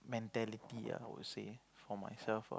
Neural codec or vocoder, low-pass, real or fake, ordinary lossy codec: none; none; real; none